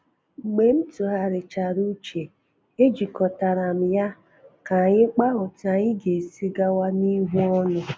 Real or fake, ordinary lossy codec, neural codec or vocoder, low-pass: real; none; none; none